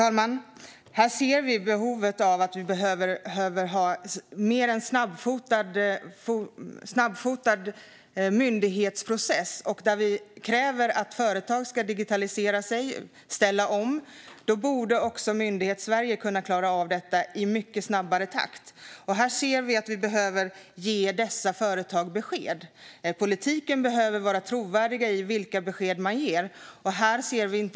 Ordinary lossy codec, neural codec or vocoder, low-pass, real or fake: none; none; none; real